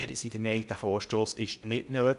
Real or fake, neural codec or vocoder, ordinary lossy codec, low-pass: fake; codec, 16 kHz in and 24 kHz out, 0.8 kbps, FocalCodec, streaming, 65536 codes; none; 10.8 kHz